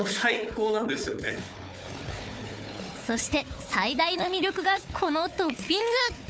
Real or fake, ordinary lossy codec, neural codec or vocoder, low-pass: fake; none; codec, 16 kHz, 4 kbps, FunCodec, trained on Chinese and English, 50 frames a second; none